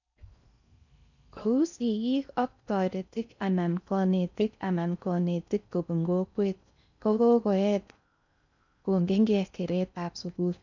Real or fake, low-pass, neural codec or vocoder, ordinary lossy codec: fake; 7.2 kHz; codec, 16 kHz in and 24 kHz out, 0.6 kbps, FocalCodec, streaming, 4096 codes; none